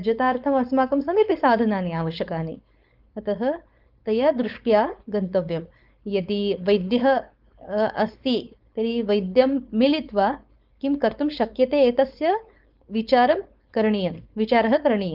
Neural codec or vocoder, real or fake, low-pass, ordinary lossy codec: codec, 16 kHz, 4.8 kbps, FACodec; fake; 5.4 kHz; Opus, 32 kbps